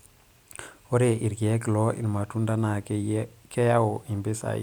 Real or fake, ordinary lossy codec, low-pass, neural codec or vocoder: real; none; none; none